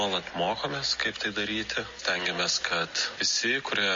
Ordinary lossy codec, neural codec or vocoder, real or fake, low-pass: MP3, 32 kbps; none; real; 7.2 kHz